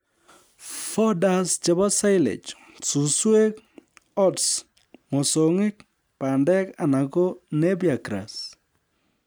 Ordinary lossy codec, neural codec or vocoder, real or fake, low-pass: none; none; real; none